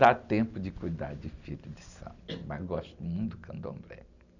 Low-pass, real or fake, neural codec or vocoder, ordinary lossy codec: 7.2 kHz; real; none; none